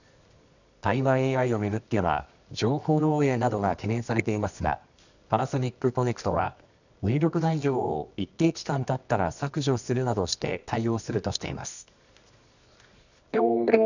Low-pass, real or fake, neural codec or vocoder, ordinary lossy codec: 7.2 kHz; fake; codec, 24 kHz, 0.9 kbps, WavTokenizer, medium music audio release; none